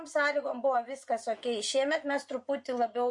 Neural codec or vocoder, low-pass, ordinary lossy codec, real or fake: vocoder, 24 kHz, 100 mel bands, Vocos; 10.8 kHz; MP3, 48 kbps; fake